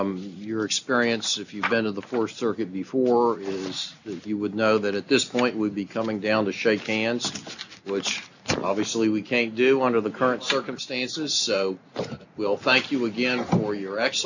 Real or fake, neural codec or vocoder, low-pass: real; none; 7.2 kHz